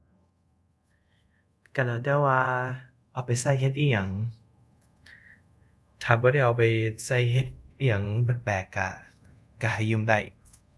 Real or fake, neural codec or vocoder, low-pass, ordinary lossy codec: fake; codec, 24 kHz, 0.5 kbps, DualCodec; none; none